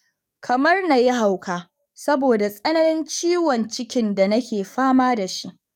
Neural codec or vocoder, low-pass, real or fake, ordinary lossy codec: codec, 44.1 kHz, 7.8 kbps, DAC; 19.8 kHz; fake; none